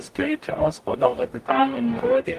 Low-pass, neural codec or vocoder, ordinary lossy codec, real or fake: 14.4 kHz; codec, 44.1 kHz, 0.9 kbps, DAC; Opus, 32 kbps; fake